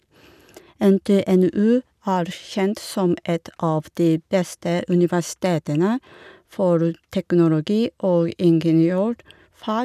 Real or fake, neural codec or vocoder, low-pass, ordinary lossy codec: real; none; 14.4 kHz; none